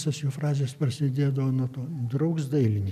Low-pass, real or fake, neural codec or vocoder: 14.4 kHz; real; none